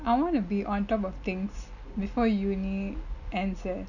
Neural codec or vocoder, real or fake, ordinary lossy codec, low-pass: none; real; none; 7.2 kHz